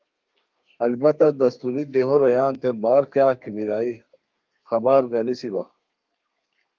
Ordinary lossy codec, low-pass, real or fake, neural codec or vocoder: Opus, 24 kbps; 7.2 kHz; fake; codec, 32 kHz, 1.9 kbps, SNAC